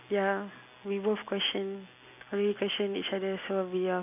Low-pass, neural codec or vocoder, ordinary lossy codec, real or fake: 3.6 kHz; none; none; real